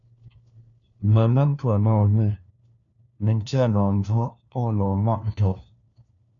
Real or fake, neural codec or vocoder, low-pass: fake; codec, 16 kHz, 1 kbps, FunCodec, trained on LibriTTS, 50 frames a second; 7.2 kHz